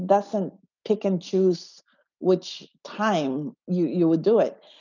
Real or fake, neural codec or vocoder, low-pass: real; none; 7.2 kHz